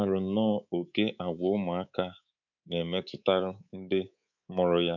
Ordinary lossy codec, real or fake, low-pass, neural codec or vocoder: none; fake; 7.2 kHz; codec, 24 kHz, 3.1 kbps, DualCodec